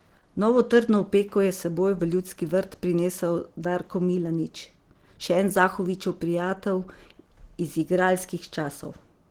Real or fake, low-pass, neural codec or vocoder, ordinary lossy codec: real; 19.8 kHz; none; Opus, 16 kbps